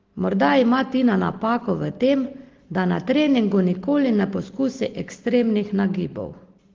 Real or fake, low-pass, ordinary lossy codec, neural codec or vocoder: real; 7.2 kHz; Opus, 16 kbps; none